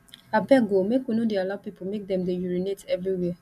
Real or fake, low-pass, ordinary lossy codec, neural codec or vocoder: real; 14.4 kHz; none; none